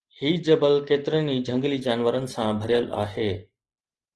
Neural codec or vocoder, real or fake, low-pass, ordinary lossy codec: none; real; 10.8 kHz; Opus, 16 kbps